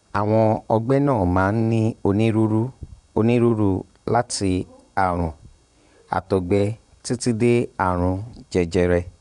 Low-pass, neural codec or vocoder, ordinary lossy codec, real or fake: 10.8 kHz; vocoder, 24 kHz, 100 mel bands, Vocos; none; fake